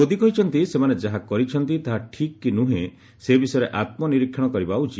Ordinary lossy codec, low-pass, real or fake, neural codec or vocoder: none; none; real; none